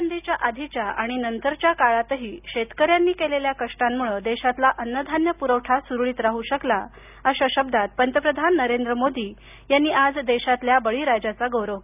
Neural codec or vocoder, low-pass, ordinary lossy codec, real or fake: none; 3.6 kHz; none; real